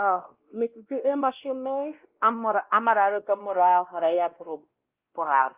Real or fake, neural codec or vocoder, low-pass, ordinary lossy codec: fake; codec, 16 kHz, 1 kbps, X-Codec, WavLM features, trained on Multilingual LibriSpeech; 3.6 kHz; Opus, 32 kbps